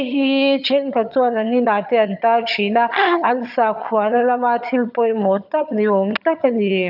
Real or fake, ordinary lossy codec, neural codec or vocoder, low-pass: fake; none; vocoder, 22.05 kHz, 80 mel bands, HiFi-GAN; 5.4 kHz